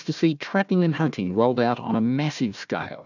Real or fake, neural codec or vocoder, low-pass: fake; codec, 16 kHz, 1 kbps, FunCodec, trained on Chinese and English, 50 frames a second; 7.2 kHz